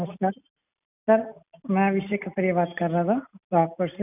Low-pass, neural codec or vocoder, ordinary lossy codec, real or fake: 3.6 kHz; none; none; real